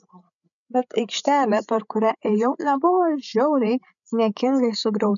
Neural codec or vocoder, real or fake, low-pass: codec, 16 kHz, 8 kbps, FreqCodec, larger model; fake; 7.2 kHz